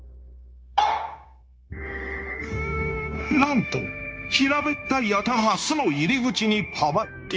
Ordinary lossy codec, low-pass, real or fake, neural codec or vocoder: none; none; fake; codec, 16 kHz, 0.9 kbps, LongCat-Audio-Codec